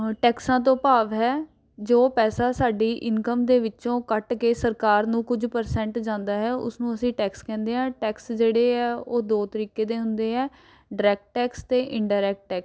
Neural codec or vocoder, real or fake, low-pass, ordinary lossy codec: none; real; none; none